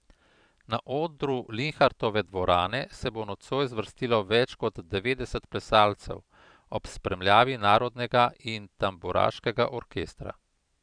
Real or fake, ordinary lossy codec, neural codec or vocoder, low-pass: fake; none; vocoder, 44.1 kHz, 128 mel bands every 512 samples, BigVGAN v2; 9.9 kHz